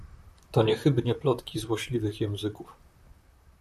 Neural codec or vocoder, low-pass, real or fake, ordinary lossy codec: vocoder, 44.1 kHz, 128 mel bands, Pupu-Vocoder; 14.4 kHz; fake; AAC, 96 kbps